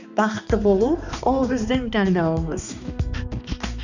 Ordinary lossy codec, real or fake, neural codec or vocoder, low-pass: none; fake; codec, 16 kHz, 2 kbps, X-Codec, HuBERT features, trained on balanced general audio; 7.2 kHz